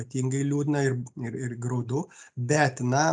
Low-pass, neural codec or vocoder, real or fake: 9.9 kHz; none; real